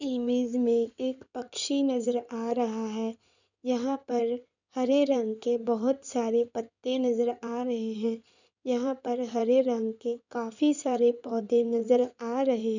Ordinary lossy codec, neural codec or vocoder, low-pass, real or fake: none; codec, 16 kHz in and 24 kHz out, 2.2 kbps, FireRedTTS-2 codec; 7.2 kHz; fake